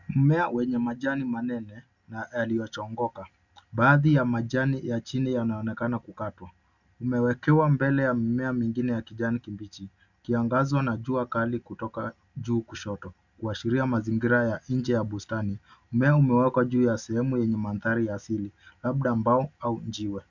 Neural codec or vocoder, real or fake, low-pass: none; real; 7.2 kHz